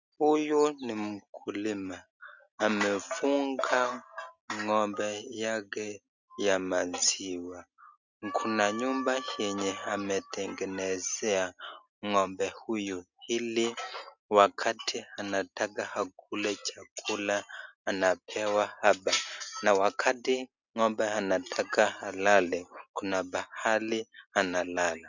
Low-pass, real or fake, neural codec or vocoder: 7.2 kHz; real; none